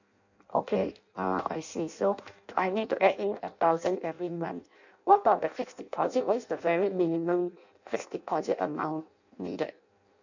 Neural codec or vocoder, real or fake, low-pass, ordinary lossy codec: codec, 16 kHz in and 24 kHz out, 0.6 kbps, FireRedTTS-2 codec; fake; 7.2 kHz; none